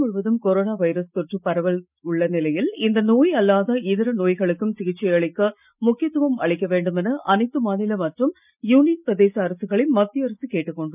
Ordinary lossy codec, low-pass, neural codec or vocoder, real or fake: none; 3.6 kHz; none; real